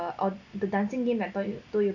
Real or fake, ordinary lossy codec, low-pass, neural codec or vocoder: real; none; 7.2 kHz; none